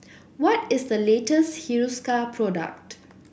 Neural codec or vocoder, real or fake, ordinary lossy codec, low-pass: none; real; none; none